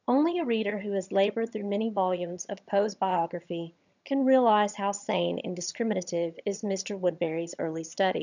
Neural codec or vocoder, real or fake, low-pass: vocoder, 22.05 kHz, 80 mel bands, HiFi-GAN; fake; 7.2 kHz